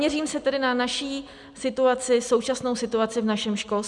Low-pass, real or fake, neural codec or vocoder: 10.8 kHz; real; none